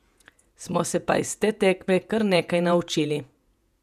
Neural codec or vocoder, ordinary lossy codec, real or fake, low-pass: vocoder, 48 kHz, 128 mel bands, Vocos; none; fake; 14.4 kHz